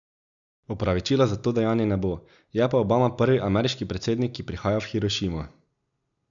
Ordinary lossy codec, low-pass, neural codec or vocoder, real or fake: none; 7.2 kHz; none; real